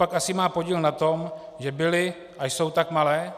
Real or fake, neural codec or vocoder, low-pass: real; none; 14.4 kHz